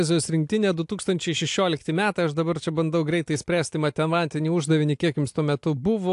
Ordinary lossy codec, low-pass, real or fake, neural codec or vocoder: AAC, 64 kbps; 10.8 kHz; real; none